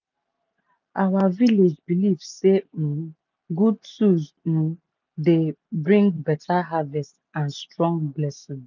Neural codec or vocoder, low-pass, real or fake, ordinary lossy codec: none; 7.2 kHz; real; none